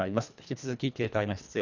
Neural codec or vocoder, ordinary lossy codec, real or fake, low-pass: codec, 24 kHz, 1.5 kbps, HILCodec; none; fake; 7.2 kHz